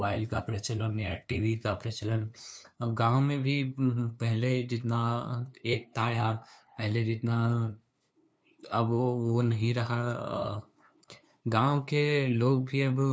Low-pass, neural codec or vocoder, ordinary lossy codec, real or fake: none; codec, 16 kHz, 2 kbps, FunCodec, trained on LibriTTS, 25 frames a second; none; fake